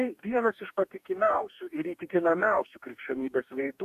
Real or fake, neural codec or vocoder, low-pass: fake; codec, 44.1 kHz, 2.6 kbps, DAC; 14.4 kHz